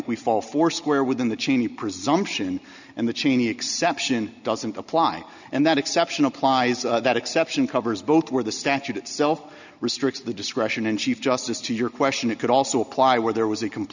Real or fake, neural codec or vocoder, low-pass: real; none; 7.2 kHz